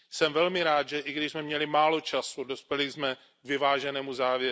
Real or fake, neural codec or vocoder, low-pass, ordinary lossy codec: real; none; none; none